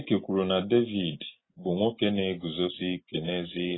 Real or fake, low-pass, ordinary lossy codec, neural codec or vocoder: real; 7.2 kHz; AAC, 16 kbps; none